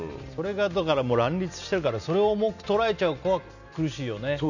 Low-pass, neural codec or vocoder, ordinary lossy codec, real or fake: 7.2 kHz; none; none; real